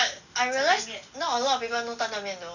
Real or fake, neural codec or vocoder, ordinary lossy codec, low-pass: real; none; none; 7.2 kHz